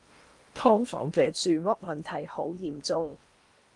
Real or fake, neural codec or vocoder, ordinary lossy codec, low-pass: fake; codec, 16 kHz in and 24 kHz out, 0.8 kbps, FocalCodec, streaming, 65536 codes; Opus, 32 kbps; 10.8 kHz